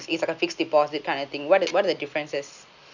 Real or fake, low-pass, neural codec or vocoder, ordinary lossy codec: real; 7.2 kHz; none; none